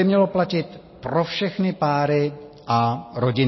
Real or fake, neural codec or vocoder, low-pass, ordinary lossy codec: real; none; 7.2 kHz; MP3, 24 kbps